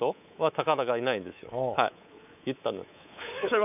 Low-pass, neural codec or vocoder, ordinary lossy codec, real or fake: 3.6 kHz; codec, 24 kHz, 3.1 kbps, DualCodec; none; fake